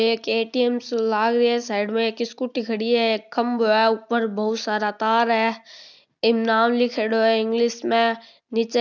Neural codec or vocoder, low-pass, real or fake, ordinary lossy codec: none; 7.2 kHz; real; none